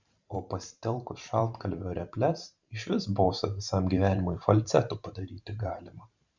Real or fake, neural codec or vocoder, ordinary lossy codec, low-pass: fake; vocoder, 44.1 kHz, 128 mel bands every 512 samples, BigVGAN v2; Opus, 64 kbps; 7.2 kHz